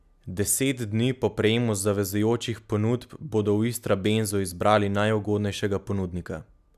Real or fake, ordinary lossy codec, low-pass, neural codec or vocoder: real; none; 14.4 kHz; none